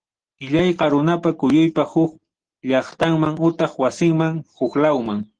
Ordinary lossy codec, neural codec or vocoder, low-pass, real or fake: Opus, 16 kbps; none; 9.9 kHz; real